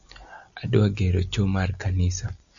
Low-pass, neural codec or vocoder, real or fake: 7.2 kHz; none; real